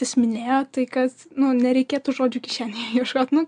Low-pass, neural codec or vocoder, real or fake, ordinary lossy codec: 9.9 kHz; none; real; MP3, 64 kbps